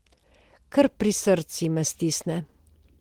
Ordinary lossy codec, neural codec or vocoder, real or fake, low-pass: Opus, 16 kbps; none; real; 19.8 kHz